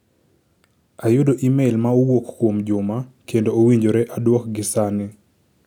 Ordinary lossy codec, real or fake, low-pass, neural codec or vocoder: none; real; 19.8 kHz; none